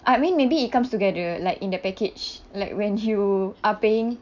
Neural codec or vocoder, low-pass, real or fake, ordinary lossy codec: none; 7.2 kHz; real; none